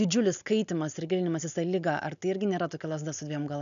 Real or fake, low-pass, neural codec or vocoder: real; 7.2 kHz; none